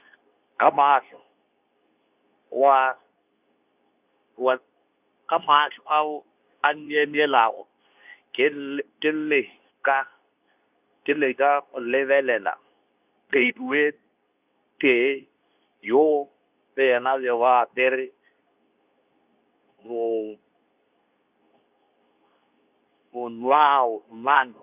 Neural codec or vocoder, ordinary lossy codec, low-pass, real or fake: codec, 24 kHz, 0.9 kbps, WavTokenizer, medium speech release version 2; none; 3.6 kHz; fake